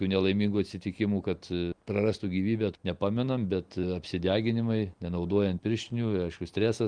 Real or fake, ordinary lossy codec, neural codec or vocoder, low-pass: real; Opus, 24 kbps; none; 9.9 kHz